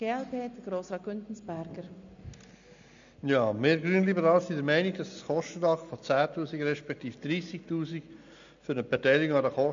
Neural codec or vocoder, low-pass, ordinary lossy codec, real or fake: none; 7.2 kHz; MP3, 64 kbps; real